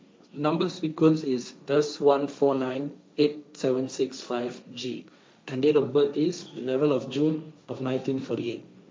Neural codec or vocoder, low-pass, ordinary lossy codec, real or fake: codec, 16 kHz, 1.1 kbps, Voila-Tokenizer; none; none; fake